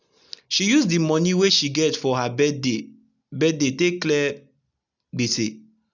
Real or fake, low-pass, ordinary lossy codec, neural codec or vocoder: real; 7.2 kHz; none; none